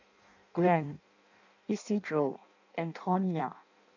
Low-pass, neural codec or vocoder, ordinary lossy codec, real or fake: 7.2 kHz; codec, 16 kHz in and 24 kHz out, 0.6 kbps, FireRedTTS-2 codec; none; fake